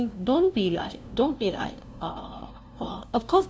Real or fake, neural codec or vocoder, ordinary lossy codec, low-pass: fake; codec, 16 kHz, 0.5 kbps, FunCodec, trained on LibriTTS, 25 frames a second; none; none